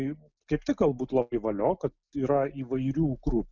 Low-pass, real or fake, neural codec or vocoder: 7.2 kHz; real; none